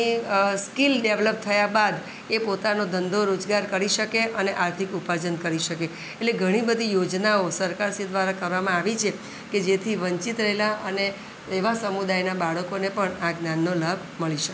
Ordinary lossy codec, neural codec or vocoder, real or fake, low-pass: none; none; real; none